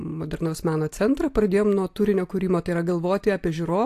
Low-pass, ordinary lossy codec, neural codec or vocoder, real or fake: 14.4 kHz; AAC, 64 kbps; none; real